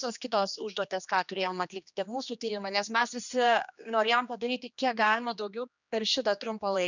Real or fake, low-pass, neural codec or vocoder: fake; 7.2 kHz; codec, 16 kHz, 2 kbps, X-Codec, HuBERT features, trained on general audio